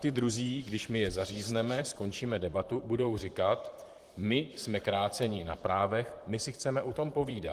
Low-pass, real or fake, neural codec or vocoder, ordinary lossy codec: 14.4 kHz; fake; vocoder, 44.1 kHz, 128 mel bands, Pupu-Vocoder; Opus, 24 kbps